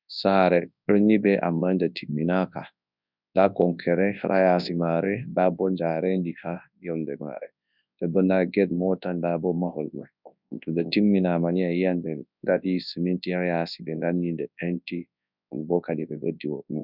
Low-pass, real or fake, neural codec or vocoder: 5.4 kHz; fake; codec, 24 kHz, 0.9 kbps, WavTokenizer, large speech release